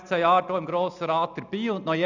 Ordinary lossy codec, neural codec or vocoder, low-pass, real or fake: none; none; 7.2 kHz; real